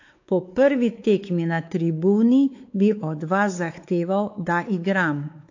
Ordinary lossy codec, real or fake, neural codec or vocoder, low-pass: AAC, 48 kbps; fake; codec, 16 kHz, 4 kbps, X-Codec, WavLM features, trained on Multilingual LibriSpeech; 7.2 kHz